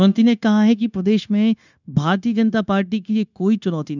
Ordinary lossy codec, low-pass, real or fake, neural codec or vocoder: none; 7.2 kHz; fake; codec, 16 kHz, 0.9 kbps, LongCat-Audio-Codec